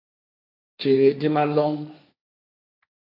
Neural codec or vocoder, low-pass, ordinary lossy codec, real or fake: codec, 16 kHz, 1.1 kbps, Voila-Tokenizer; 5.4 kHz; AAC, 48 kbps; fake